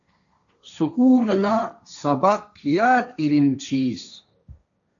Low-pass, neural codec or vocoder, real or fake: 7.2 kHz; codec, 16 kHz, 1.1 kbps, Voila-Tokenizer; fake